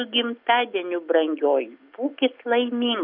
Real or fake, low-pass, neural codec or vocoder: real; 5.4 kHz; none